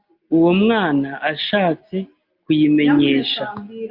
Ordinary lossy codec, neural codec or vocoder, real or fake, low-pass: Opus, 24 kbps; none; real; 5.4 kHz